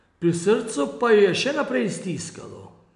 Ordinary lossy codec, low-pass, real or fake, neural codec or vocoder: MP3, 96 kbps; 10.8 kHz; real; none